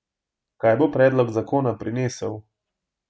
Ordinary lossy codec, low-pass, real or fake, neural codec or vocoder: none; none; real; none